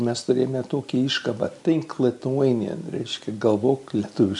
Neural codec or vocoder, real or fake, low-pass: none; real; 10.8 kHz